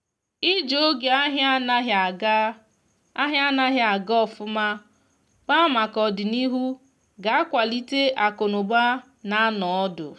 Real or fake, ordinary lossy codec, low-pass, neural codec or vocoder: real; none; none; none